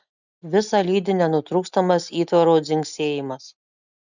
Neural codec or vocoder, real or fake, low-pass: none; real; 7.2 kHz